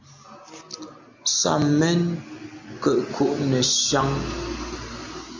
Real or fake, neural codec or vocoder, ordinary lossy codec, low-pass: real; none; MP3, 64 kbps; 7.2 kHz